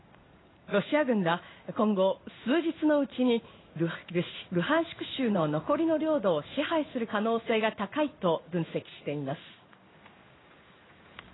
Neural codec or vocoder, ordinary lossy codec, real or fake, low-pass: codec, 16 kHz in and 24 kHz out, 1 kbps, XY-Tokenizer; AAC, 16 kbps; fake; 7.2 kHz